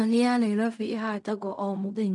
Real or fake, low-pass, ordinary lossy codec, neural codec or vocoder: fake; 10.8 kHz; none; codec, 16 kHz in and 24 kHz out, 0.4 kbps, LongCat-Audio-Codec, fine tuned four codebook decoder